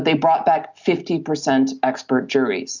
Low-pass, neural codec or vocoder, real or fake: 7.2 kHz; none; real